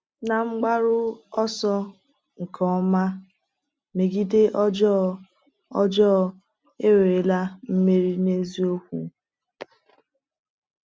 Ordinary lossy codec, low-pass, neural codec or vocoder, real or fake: none; none; none; real